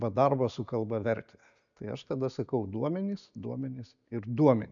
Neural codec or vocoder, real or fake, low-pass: none; real; 7.2 kHz